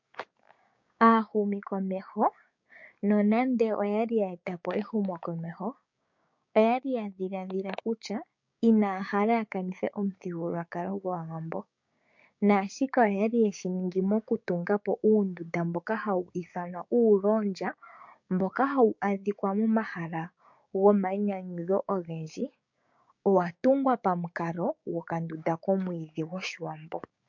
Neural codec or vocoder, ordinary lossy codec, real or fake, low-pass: codec, 16 kHz, 6 kbps, DAC; MP3, 48 kbps; fake; 7.2 kHz